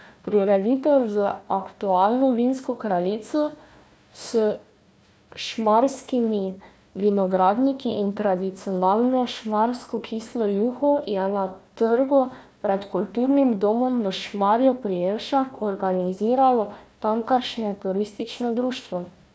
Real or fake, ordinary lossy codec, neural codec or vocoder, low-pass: fake; none; codec, 16 kHz, 1 kbps, FunCodec, trained on Chinese and English, 50 frames a second; none